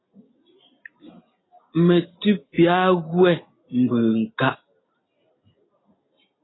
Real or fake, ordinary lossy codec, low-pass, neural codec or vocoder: real; AAC, 16 kbps; 7.2 kHz; none